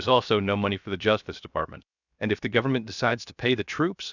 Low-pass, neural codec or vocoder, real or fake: 7.2 kHz; codec, 16 kHz, 0.7 kbps, FocalCodec; fake